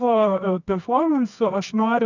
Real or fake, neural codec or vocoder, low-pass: fake; codec, 24 kHz, 0.9 kbps, WavTokenizer, medium music audio release; 7.2 kHz